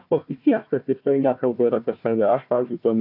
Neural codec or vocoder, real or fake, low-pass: codec, 16 kHz, 1 kbps, FunCodec, trained on Chinese and English, 50 frames a second; fake; 5.4 kHz